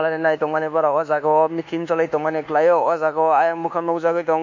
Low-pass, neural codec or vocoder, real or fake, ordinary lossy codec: 7.2 kHz; codec, 24 kHz, 1.2 kbps, DualCodec; fake; MP3, 48 kbps